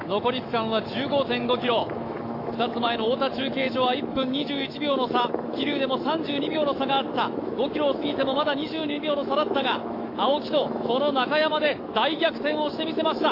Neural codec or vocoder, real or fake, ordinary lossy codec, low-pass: autoencoder, 48 kHz, 128 numbers a frame, DAC-VAE, trained on Japanese speech; fake; AAC, 32 kbps; 5.4 kHz